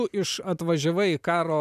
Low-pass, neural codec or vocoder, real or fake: 14.4 kHz; vocoder, 44.1 kHz, 128 mel bands every 512 samples, BigVGAN v2; fake